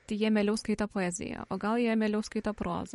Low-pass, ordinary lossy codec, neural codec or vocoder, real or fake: 19.8 kHz; MP3, 48 kbps; vocoder, 44.1 kHz, 128 mel bands every 512 samples, BigVGAN v2; fake